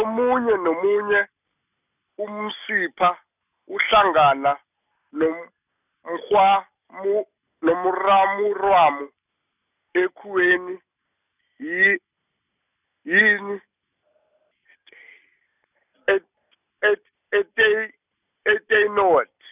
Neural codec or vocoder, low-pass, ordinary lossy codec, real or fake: none; 3.6 kHz; none; real